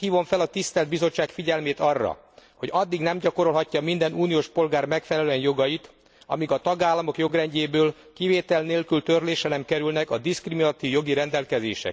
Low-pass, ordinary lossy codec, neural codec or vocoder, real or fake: none; none; none; real